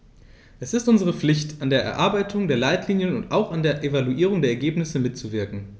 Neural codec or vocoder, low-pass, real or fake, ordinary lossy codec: none; none; real; none